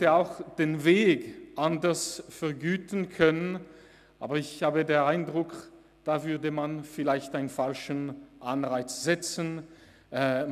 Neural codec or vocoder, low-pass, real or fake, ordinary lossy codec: none; 14.4 kHz; real; none